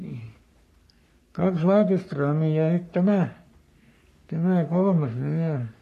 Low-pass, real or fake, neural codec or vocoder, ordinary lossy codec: 14.4 kHz; fake; codec, 44.1 kHz, 3.4 kbps, Pupu-Codec; MP3, 64 kbps